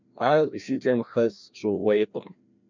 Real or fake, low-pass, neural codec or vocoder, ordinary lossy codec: fake; 7.2 kHz; codec, 16 kHz, 1 kbps, FreqCodec, larger model; MP3, 64 kbps